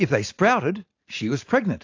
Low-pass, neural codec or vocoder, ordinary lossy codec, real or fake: 7.2 kHz; none; AAC, 48 kbps; real